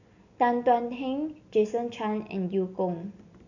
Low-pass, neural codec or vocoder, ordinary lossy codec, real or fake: 7.2 kHz; none; none; real